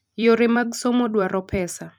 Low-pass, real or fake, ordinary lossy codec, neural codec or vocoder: none; fake; none; vocoder, 44.1 kHz, 128 mel bands every 256 samples, BigVGAN v2